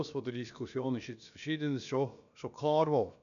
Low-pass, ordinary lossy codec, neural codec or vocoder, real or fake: 7.2 kHz; none; codec, 16 kHz, about 1 kbps, DyCAST, with the encoder's durations; fake